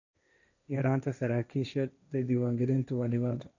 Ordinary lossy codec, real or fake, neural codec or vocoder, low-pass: none; fake; codec, 16 kHz, 1.1 kbps, Voila-Tokenizer; 7.2 kHz